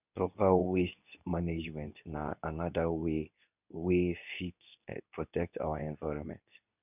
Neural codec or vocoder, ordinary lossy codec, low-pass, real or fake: codec, 24 kHz, 0.9 kbps, WavTokenizer, medium speech release version 2; none; 3.6 kHz; fake